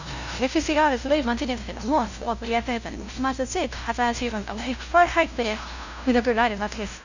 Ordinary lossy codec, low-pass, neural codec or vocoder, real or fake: none; 7.2 kHz; codec, 16 kHz, 0.5 kbps, FunCodec, trained on LibriTTS, 25 frames a second; fake